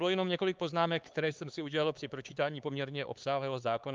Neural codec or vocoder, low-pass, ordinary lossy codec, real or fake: codec, 16 kHz, 4 kbps, X-Codec, HuBERT features, trained on LibriSpeech; 7.2 kHz; Opus, 24 kbps; fake